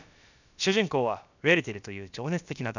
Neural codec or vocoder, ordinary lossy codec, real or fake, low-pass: codec, 16 kHz, about 1 kbps, DyCAST, with the encoder's durations; none; fake; 7.2 kHz